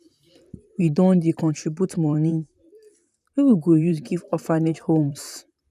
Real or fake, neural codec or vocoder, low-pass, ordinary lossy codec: fake; vocoder, 44.1 kHz, 128 mel bands, Pupu-Vocoder; 14.4 kHz; none